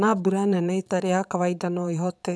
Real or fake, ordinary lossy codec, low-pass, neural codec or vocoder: fake; none; none; vocoder, 22.05 kHz, 80 mel bands, Vocos